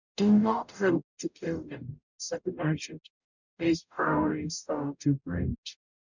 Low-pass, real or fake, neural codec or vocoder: 7.2 kHz; fake; codec, 44.1 kHz, 0.9 kbps, DAC